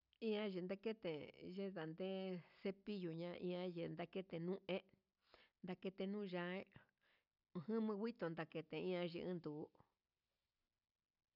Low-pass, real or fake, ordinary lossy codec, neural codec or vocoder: 5.4 kHz; real; none; none